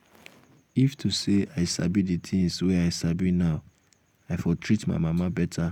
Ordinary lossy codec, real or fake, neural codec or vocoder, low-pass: none; real; none; 19.8 kHz